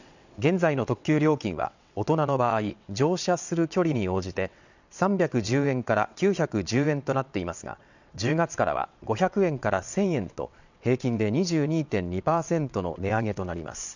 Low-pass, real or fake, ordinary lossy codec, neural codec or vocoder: 7.2 kHz; fake; none; vocoder, 22.05 kHz, 80 mel bands, WaveNeXt